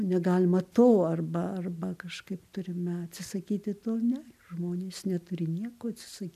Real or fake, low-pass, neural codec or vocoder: real; 14.4 kHz; none